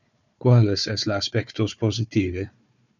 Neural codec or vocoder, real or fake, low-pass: codec, 16 kHz, 4 kbps, FunCodec, trained on Chinese and English, 50 frames a second; fake; 7.2 kHz